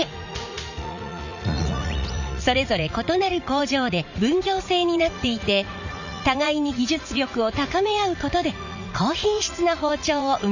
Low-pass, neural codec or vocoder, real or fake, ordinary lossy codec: 7.2 kHz; vocoder, 44.1 kHz, 80 mel bands, Vocos; fake; none